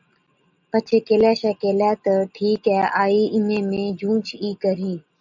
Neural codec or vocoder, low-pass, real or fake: none; 7.2 kHz; real